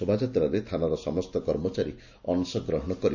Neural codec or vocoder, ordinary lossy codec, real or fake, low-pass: none; none; real; 7.2 kHz